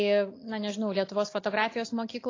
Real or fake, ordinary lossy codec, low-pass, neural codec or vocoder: real; AAC, 32 kbps; 7.2 kHz; none